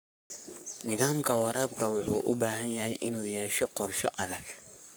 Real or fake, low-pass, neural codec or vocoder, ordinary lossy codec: fake; none; codec, 44.1 kHz, 3.4 kbps, Pupu-Codec; none